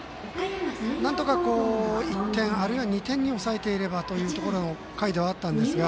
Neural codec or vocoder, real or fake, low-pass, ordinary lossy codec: none; real; none; none